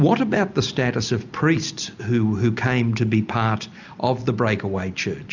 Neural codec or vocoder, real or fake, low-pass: none; real; 7.2 kHz